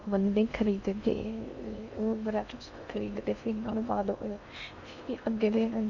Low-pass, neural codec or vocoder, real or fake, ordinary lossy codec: 7.2 kHz; codec, 16 kHz in and 24 kHz out, 0.6 kbps, FocalCodec, streaming, 4096 codes; fake; none